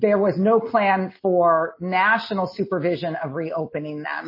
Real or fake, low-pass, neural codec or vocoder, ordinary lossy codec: real; 5.4 kHz; none; MP3, 24 kbps